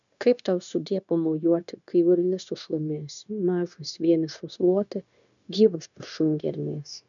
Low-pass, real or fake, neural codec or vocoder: 7.2 kHz; fake; codec, 16 kHz, 0.9 kbps, LongCat-Audio-Codec